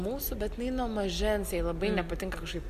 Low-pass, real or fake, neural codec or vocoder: 14.4 kHz; real; none